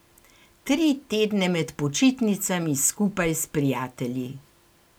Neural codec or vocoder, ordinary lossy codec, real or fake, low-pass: none; none; real; none